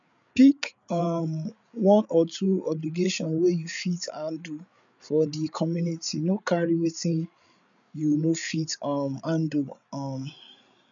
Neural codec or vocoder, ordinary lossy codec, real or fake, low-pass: codec, 16 kHz, 8 kbps, FreqCodec, larger model; none; fake; 7.2 kHz